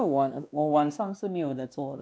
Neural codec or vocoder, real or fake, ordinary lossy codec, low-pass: codec, 16 kHz, 1 kbps, X-Codec, WavLM features, trained on Multilingual LibriSpeech; fake; none; none